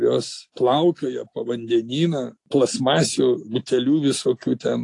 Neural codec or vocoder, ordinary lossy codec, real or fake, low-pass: none; AAC, 48 kbps; real; 10.8 kHz